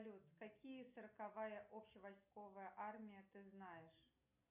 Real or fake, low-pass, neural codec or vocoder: real; 3.6 kHz; none